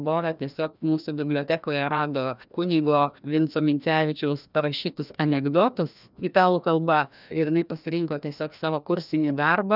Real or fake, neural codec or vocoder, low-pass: fake; codec, 16 kHz, 1 kbps, FreqCodec, larger model; 5.4 kHz